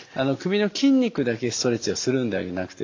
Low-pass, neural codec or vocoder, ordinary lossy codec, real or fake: 7.2 kHz; none; AAC, 48 kbps; real